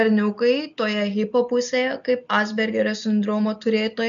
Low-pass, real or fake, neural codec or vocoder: 7.2 kHz; real; none